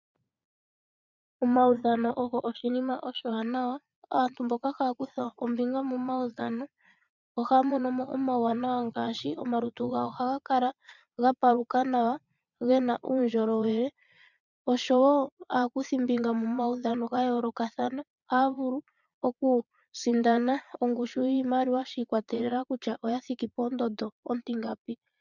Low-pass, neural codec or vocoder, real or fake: 7.2 kHz; vocoder, 22.05 kHz, 80 mel bands, Vocos; fake